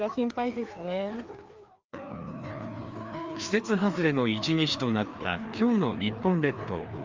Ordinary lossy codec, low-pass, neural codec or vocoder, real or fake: Opus, 32 kbps; 7.2 kHz; codec, 16 kHz, 2 kbps, FreqCodec, larger model; fake